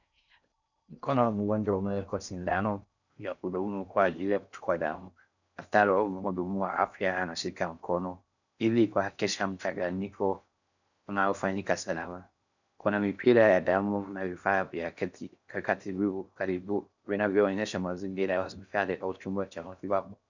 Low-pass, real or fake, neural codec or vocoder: 7.2 kHz; fake; codec, 16 kHz in and 24 kHz out, 0.6 kbps, FocalCodec, streaming, 4096 codes